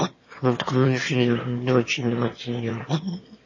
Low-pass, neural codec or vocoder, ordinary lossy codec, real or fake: 7.2 kHz; autoencoder, 22.05 kHz, a latent of 192 numbers a frame, VITS, trained on one speaker; MP3, 32 kbps; fake